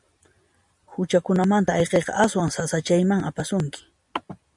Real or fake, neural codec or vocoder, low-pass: real; none; 10.8 kHz